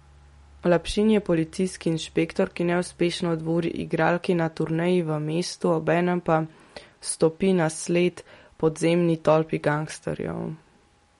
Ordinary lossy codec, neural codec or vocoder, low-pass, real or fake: MP3, 48 kbps; none; 19.8 kHz; real